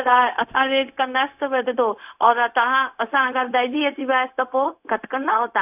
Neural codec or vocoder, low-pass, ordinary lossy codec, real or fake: codec, 16 kHz, 0.4 kbps, LongCat-Audio-Codec; 3.6 kHz; none; fake